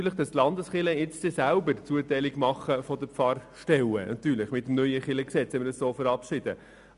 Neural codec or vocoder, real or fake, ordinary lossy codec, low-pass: none; real; none; 10.8 kHz